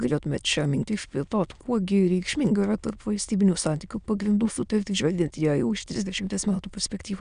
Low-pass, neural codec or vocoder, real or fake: 9.9 kHz; autoencoder, 22.05 kHz, a latent of 192 numbers a frame, VITS, trained on many speakers; fake